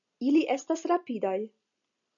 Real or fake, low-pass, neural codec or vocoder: real; 7.2 kHz; none